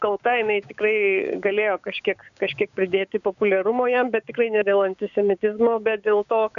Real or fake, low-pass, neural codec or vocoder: fake; 7.2 kHz; codec, 16 kHz, 6 kbps, DAC